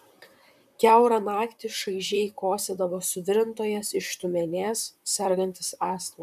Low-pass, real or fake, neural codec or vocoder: 14.4 kHz; fake; vocoder, 44.1 kHz, 128 mel bands, Pupu-Vocoder